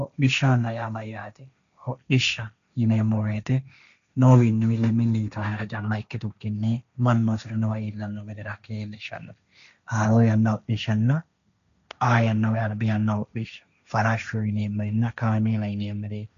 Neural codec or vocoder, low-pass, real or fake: codec, 16 kHz, 1.1 kbps, Voila-Tokenizer; 7.2 kHz; fake